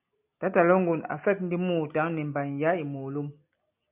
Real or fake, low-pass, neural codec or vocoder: real; 3.6 kHz; none